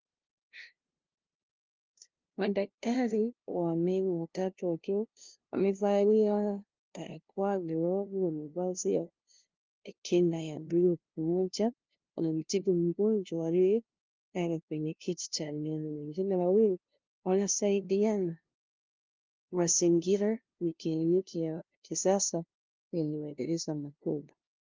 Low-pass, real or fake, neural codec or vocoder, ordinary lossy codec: 7.2 kHz; fake; codec, 16 kHz, 0.5 kbps, FunCodec, trained on LibriTTS, 25 frames a second; Opus, 32 kbps